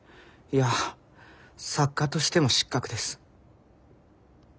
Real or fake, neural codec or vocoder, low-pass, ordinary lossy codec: real; none; none; none